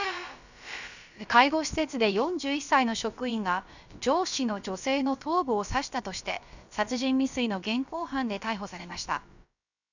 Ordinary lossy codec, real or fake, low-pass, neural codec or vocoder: none; fake; 7.2 kHz; codec, 16 kHz, about 1 kbps, DyCAST, with the encoder's durations